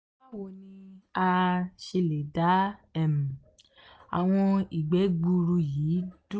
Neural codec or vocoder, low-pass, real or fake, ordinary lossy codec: none; none; real; none